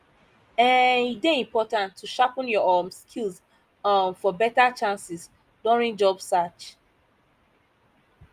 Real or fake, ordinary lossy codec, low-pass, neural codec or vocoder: real; Opus, 32 kbps; 14.4 kHz; none